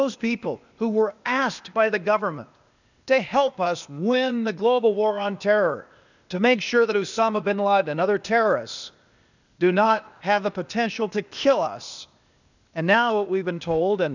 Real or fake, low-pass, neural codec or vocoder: fake; 7.2 kHz; codec, 16 kHz, 0.8 kbps, ZipCodec